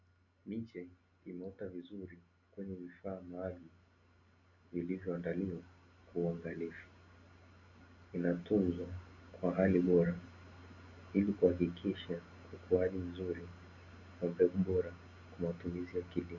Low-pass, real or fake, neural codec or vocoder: 7.2 kHz; real; none